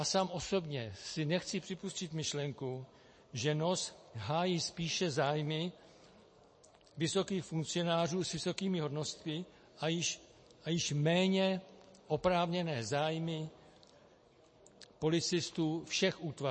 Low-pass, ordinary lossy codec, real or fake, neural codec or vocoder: 9.9 kHz; MP3, 32 kbps; real; none